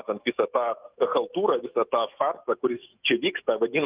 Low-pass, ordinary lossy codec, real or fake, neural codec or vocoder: 3.6 kHz; Opus, 24 kbps; fake; autoencoder, 48 kHz, 128 numbers a frame, DAC-VAE, trained on Japanese speech